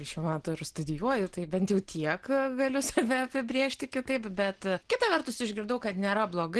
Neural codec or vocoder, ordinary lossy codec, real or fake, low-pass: none; Opus, 16 kbps; real; 10.8 kHz